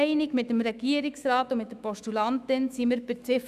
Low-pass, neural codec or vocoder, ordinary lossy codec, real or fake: 14.4 kHz; autoencoder, 48 kHz, 128 numbers a frame, DAC-VAE, trained on Japanese speech; none; fake